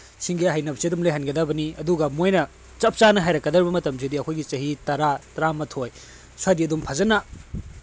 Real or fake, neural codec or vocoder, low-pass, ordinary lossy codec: real; none; none; none